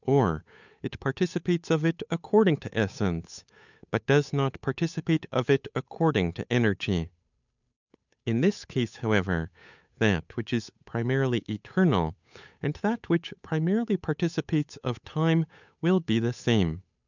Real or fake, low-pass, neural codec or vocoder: fake; 7.2 kHz; codec, 16 kHz, 8 kbps, FunCodec, trained on Chinese and English, 25 frames a second